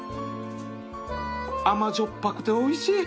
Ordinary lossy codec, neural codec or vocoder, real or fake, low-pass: none; none; real; none